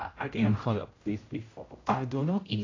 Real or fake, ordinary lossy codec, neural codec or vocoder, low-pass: fake; AAC, 32 kbps; codec, 16 kHz, 0.5 kbps, X-Codec, HuBERT features, trained on general audio; 7.2 kHz